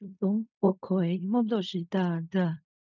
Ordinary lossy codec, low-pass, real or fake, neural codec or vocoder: none; 7.2 kHz; fake; codec, 16 kHz in and 24 kHz out, 0.4 kbps, LongCat-Audio-Codec, fine tuned four codebook decoder